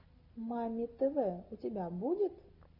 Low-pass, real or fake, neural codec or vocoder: 5.4 kHz; real; none